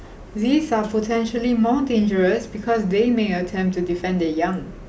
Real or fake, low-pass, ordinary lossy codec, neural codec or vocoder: real; none; none; none